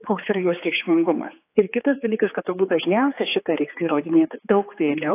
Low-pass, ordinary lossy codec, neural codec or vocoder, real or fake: 3.6 kHz; AAC, 24 kbps; codec, 16 kHz, 4 kbps, X-Codec, HuBERT features, trained on general audio; fake